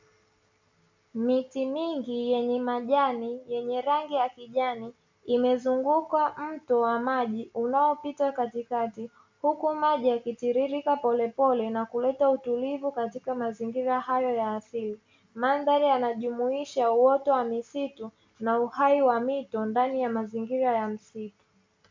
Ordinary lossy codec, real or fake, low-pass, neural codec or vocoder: MP3, 64 kbps; real; 7.2 kHz; none